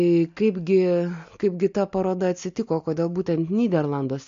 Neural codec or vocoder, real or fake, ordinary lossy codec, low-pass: none; real; MP3, 48 kbps; 7.2 kHz